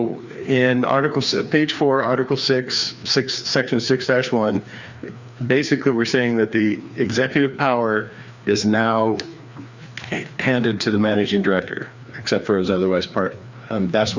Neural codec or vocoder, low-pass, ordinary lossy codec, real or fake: codec, 16 kHz, 2 kbps, FreqCodec, larger model; 7.2 kHz; Opus, 64 kbps; fake